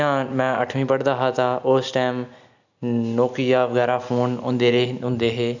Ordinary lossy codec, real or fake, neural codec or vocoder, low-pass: none; real; none; 7.2 kHz